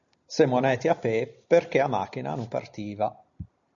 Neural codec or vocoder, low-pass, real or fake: none; 7.2 kHz; real